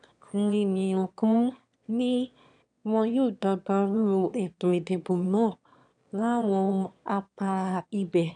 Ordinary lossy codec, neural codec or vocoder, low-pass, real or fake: none; autoencoder, 22.05 kHz, a latent of 192 numbers a frame, VITS, trained on one speaker; 9.9 kHz; fake